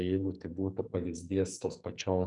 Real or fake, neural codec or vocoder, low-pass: fake; codec, 44.1 kHz, 2.6 kbps, SNAC; 10.8 kHz